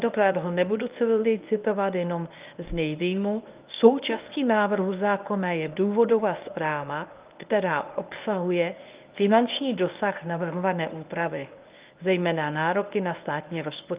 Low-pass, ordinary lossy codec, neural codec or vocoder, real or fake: 3.6 kHz; Opus, 32 kbps; codec, 24 kHz, 0.9 kbps, WavTokenizer, medium speech release version 1; fake